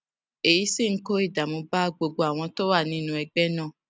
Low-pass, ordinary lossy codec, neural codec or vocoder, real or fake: none; none; none; real